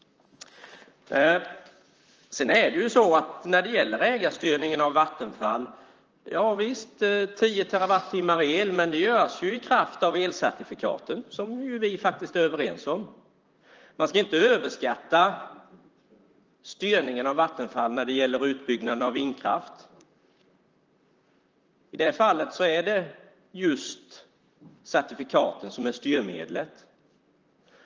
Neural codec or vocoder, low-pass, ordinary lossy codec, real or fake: vocoder, 44.1 kHz, 128 mel bands, Pupu-Vocoder; 7.2 kHz; Opus, 24 kbps; fake